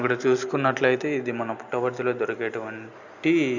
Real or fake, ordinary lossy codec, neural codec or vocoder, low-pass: real; none; none; 7.2 kHz